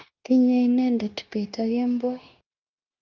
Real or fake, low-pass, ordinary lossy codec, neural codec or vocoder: fake; 7.2 kHz; Opus, 32 kbps; codec, 16 kHz, 0.9 kbps, LongCat-Audio-Codec